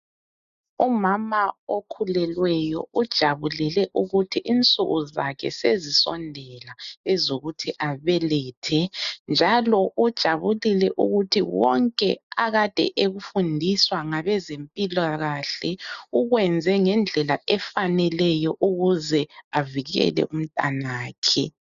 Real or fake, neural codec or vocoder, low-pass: real; none; 7.2 kHz